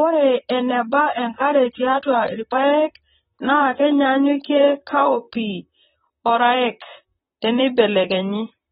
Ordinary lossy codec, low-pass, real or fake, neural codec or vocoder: AAC, 16 kbps; 19.8 kHz; fake; vocoder, 44.1 kHz, 128 mel bands, Pupu-Vocoder